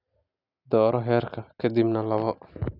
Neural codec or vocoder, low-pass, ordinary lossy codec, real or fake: none; 5.4 kHz; none; real